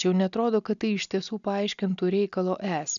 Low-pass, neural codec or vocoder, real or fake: 7.2 kHz; none; real